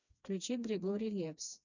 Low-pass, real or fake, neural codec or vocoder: 7.2 kHz; fake; codec, 16 kHz, 2 kbps, FreqCodec, smaller model